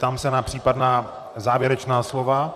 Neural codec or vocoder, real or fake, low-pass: vocoder, 44.1 kHz, 128 mel bands, Pupu-Vocoder; fake; 14.4 kHz